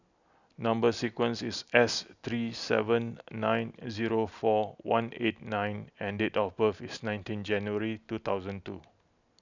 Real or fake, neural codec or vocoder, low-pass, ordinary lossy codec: real; none; 7.2 kHz; none